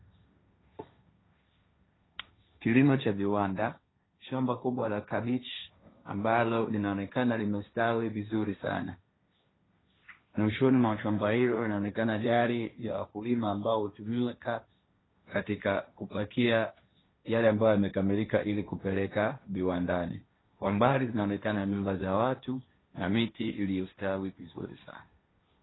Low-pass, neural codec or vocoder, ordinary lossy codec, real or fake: 7.2 kHz; codec, 16 kHz, 1.1 kbps, Voila-Tokenizer; AAC, 16 kbps; fake